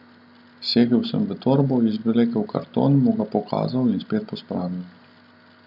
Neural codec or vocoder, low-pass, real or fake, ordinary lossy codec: none; 5.4 kHz; real; none